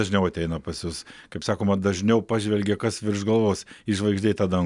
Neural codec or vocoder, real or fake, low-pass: none; real; 10.8 kHz